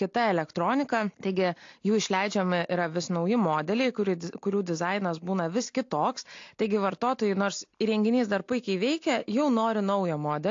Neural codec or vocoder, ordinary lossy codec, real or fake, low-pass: none; AAC, 48 kbps; real; 7.2 kHz